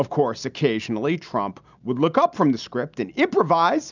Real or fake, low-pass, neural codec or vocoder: real; 7.2 kHz; none